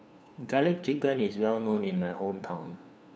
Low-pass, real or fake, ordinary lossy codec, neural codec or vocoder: none; fake; none; codec, 16 kHz, 2 kbps, FunCodec, trained on LibriTTS, 25 frames a second